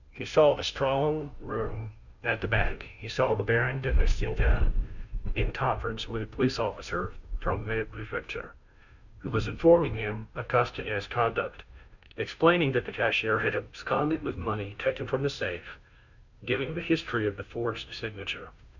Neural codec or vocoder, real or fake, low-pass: codec, 16 kHz, 0.5 kbps, FunCodec, trained on Chinese and English, 25 frames a second; fake; 7.2 kHz